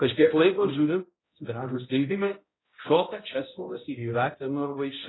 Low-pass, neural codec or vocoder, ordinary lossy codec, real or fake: 7.2 kHz; codec, 16 kHz, 0.5 kbps, X-Codec, HuBERT features, trained on balanced general audio; AAC, 16 kbps; fake